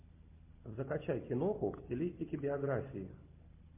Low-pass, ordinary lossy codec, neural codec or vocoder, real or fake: 3.6 kHz; MP3, 24 kbps; none; real